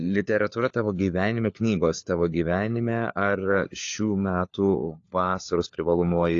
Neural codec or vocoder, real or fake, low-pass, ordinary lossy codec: codec, 16 kHz, 4 kbps, FunCodec, trained on LibriTTS, 50 frames a second; fake; 7.2 kHz; AAC, 48 kbps